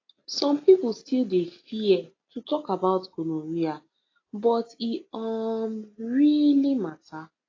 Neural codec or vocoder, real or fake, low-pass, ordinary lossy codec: none; real; 7.2 kHz; AAC, 32 kbps